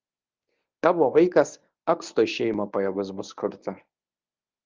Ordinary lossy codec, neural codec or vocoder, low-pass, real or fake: Opus, 24 kbps; codec, 24 kHz, 0.9 kbps, WavTokenizer, medium speech release version 1; 7.2 kHz; fake